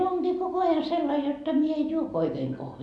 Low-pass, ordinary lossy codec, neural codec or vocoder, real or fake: none; none; none; real